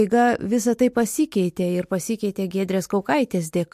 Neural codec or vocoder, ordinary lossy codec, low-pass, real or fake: none; MP3, 64 kbps; 14.4 kHz; real